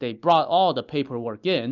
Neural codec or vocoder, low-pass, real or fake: none; 7.2 kHz; real